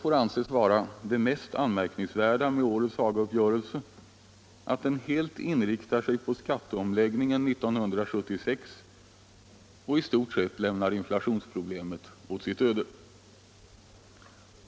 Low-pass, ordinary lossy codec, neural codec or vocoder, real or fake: none; none; none; real